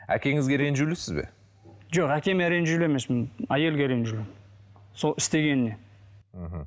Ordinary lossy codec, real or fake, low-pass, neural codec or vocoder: none; real; none; none